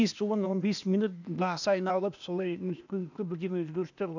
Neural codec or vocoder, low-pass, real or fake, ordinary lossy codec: codec, 16 kHz, 0.8 kbps, ZipCodec; 7.2 kHz; fake; none